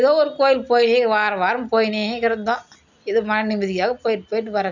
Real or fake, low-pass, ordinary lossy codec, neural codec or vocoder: real; 7.2 kHz; none; none